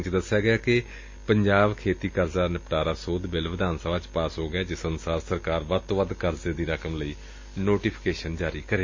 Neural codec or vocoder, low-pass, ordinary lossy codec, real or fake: none; 7.2 kHz; MP3, 32 kbps; real